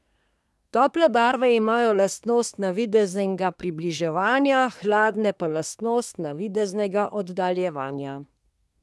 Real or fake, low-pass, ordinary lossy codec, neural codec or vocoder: fake; none; none; codec, 24 kHz, 1 kbps, SNAC